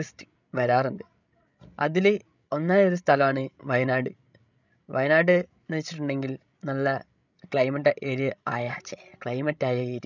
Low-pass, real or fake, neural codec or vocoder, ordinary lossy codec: 7.2 kHz; fake; codec, 16 kHz, 16 kbps, FreqCodec, larger model; none